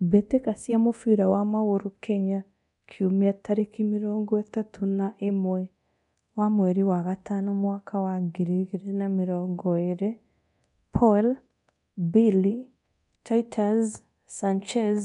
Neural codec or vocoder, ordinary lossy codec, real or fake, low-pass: codec, 24 kHz, 0.9 kbps, DualCodec; none; fake; 10.8 kHz